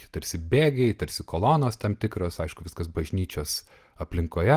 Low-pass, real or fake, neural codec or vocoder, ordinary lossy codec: 14.4 kHz; real; none; Opus, 24 kbps